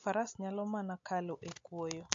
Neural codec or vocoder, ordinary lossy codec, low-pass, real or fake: none; MP3, 48 kbps; 7.2 kHz; real